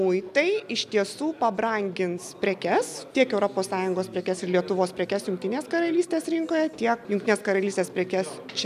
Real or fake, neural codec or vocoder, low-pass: real; none; 14.4 kHz